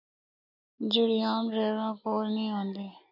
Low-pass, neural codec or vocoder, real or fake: 5.4 kHz; none; real